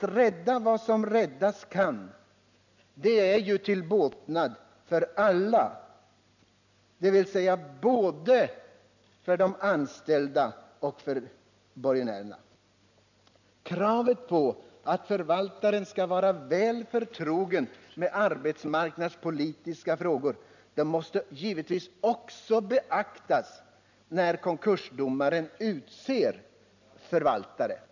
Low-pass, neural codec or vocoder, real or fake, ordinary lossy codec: 7.2 kHz; none; real; none